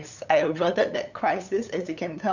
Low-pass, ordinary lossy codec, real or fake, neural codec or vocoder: 7.2 kHz; none; fake; codec, 16 kHz, 8 kbps, FunCodec, trained on LibriTTS, 25 frames a second